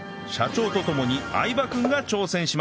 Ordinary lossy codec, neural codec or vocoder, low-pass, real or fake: none; none; none; real